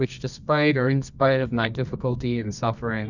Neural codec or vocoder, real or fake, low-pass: codec, 24 kHz, 0.9 kbps, WavTokenizer, medium music audio release; fake; 7.2 kHz